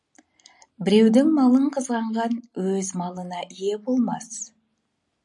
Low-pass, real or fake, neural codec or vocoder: 10.8 kHz; real; none